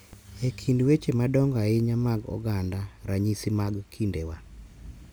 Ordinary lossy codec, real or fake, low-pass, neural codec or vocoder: none; real; none; none